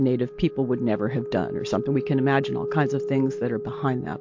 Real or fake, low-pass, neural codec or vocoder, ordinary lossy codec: real; 7.2 kHz; none; MP3, 48 kbps